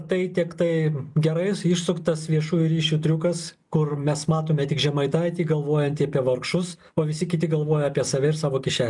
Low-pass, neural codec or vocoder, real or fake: 10.8 kHz; none; real